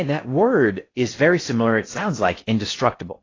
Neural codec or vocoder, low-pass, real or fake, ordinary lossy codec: codec, 16 kHz in and 24 kHz out, 0.6 kbps, FocalCodec, streaming, 2048 codes; 7.2 kHz; fake; AAC, 32 kbps